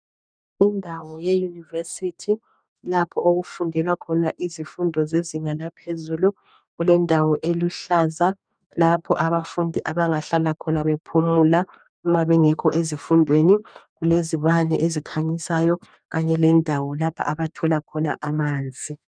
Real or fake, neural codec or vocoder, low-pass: fake; codec, 44.1 kHz, 2.6 kbps, DAC; 9.9 kHz